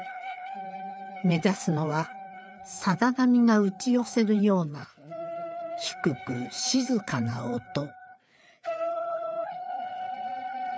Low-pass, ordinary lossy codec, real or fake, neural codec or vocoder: none; none; fake; codec, 16 kHz, 4 kbps, FreqCodec, larger model